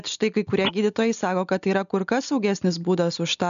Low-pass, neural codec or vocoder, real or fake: 7.2 kHz; none; real